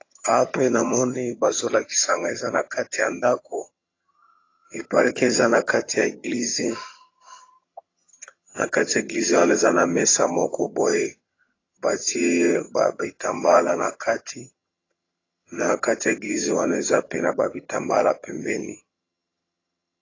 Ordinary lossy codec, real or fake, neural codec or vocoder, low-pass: AAC, 32 kbps; fake; vocoder, 22.05 kHz, 80 mel bands, HiFi-GAN; 7.2 kHz